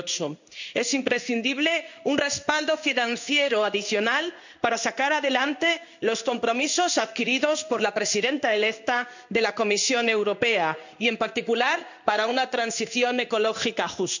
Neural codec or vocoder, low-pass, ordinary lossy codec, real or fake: codec, 16 kHz in and 24 kHz out, 1 kbps, XY-Tokenizer; 7.2 kHz; none; fake